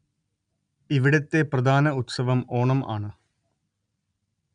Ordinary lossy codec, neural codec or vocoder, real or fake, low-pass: none; none; real; 9.9 kHz